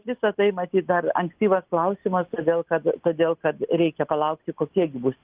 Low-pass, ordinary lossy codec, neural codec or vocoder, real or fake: 3.6 kHz; Opus, 32 kbps; none; real